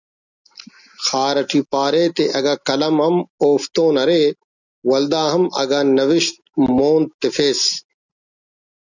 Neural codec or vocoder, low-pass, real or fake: none; 7.2 kHz; real